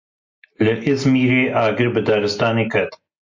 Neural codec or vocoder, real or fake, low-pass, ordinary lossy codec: none; real; 7.2 kHz; MP3, 48 kbps